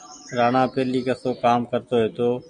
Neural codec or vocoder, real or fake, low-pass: none; real; 9.9 kHz